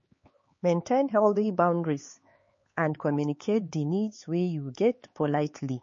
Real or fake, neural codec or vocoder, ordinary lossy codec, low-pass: fake; codec, 16 kHz, 4 kbps, X-Codec, HuBERT features, trained on LibriSpeech; MP3, 32 kbps; 7.2 kHz